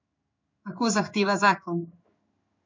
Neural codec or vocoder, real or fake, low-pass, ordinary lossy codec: codec, 16 kHz in and 24 kHz out, 1 kbps, XY-Tokenizer; fake; 7.2 kHz; MP3, 48 kbps